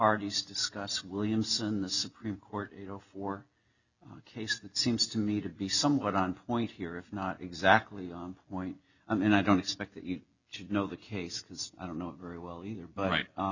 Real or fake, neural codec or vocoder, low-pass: real; none; 7.2 kHz